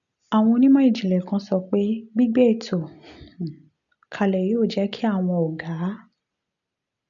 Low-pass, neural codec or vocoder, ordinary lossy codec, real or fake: 7.2 kHz; none; none; real